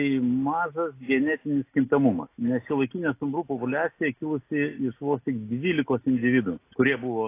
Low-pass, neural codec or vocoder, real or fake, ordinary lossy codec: 3.6 kHz; none; real; AAC, 24 kbps